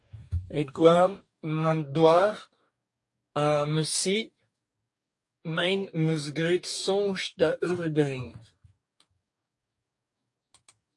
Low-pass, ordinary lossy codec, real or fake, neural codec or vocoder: 10.8 kHz; AAC, 64 kbps; fake; codec, 44.1 kHz, 2.6 kbps, DAC